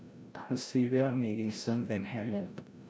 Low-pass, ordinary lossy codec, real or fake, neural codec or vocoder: none; none; fake; codec, 16 kHz, 0.5 kbps, FreqCodec, larger model